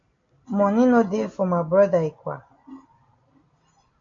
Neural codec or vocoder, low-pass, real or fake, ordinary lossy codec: none; 7.2 kHz; real; MP3, 48 kbps